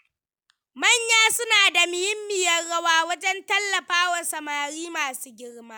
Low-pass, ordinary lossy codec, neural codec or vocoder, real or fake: none; none; none; real